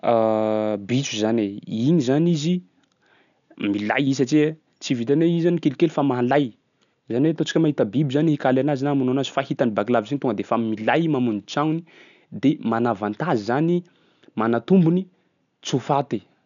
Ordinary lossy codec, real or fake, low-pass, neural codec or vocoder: none; real; 7.2 kHz; none